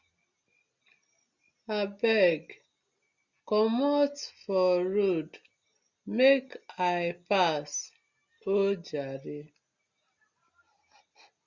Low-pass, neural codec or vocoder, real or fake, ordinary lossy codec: 7.2 kHz; none; real; Opus, 64 kbps